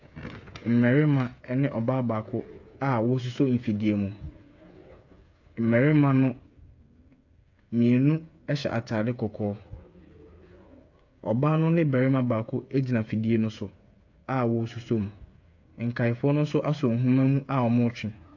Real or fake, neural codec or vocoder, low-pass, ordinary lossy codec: fake; codec, 16 kHz, 8 kbps, FreqCodec, smaller model; 7.2 kHz; AAC, 48 kbps